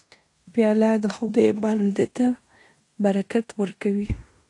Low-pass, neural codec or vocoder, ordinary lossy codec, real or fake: 10.8 kHz; codec, 16 kHz in and 24 kHz out, 0.9 kbps, LongCat-Audio-Codec, fine tuned four codebook decoder; AAC, 48 kbps; fake